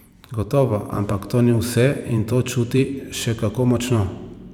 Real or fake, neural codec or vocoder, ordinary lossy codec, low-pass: fake; vocoder, 44.1 kHz, 128 mel bands every 512 samples, BigVGAN v2; none; 19.8 kHz